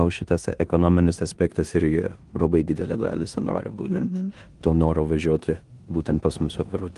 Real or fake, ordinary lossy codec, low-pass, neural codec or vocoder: fake; Opus, 24 kbps; 10.8 kHz; codec, 16 kHz in and 24 kHz out, 0.9 kbps, LongCat-Audio-Codec, four codebook decoder